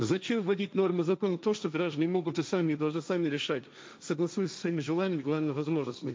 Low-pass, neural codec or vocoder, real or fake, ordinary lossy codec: none; codec, 16 kHz, 1.1 kbps, Voila-Tokenizer; fake; none